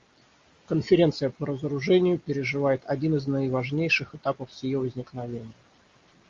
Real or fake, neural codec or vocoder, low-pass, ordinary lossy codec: real; none; 7.2 kHz; Opus, 32 kbps